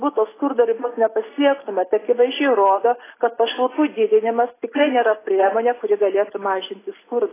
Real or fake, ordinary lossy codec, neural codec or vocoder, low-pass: real; AAC, 16 kbps; none; 3.6 kHz